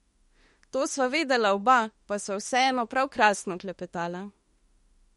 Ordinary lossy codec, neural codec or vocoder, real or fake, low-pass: MP3, 48 kbps; autoencoder, 48 kHz, 32 numbers a frame, DAC-VAE, trained on Japanese speech; fake; 19.8 kHz